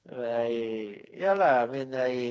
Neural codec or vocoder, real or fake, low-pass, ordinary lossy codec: codec, 16 kHz, 4 kbps, FreqCodec, smaller model; fake; none; none